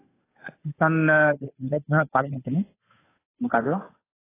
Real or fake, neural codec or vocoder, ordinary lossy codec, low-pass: fake; codec, 16 kHz, 2 kbps, FunCodec, trained on Chinese and English, 25 frames a second; AAC, 16 kbps; 3.6 kHz